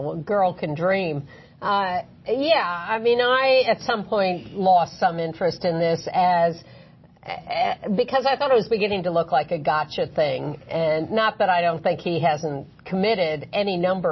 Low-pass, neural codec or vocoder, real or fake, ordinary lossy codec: 7.2 kHz; none; real; MP3, 24 kbps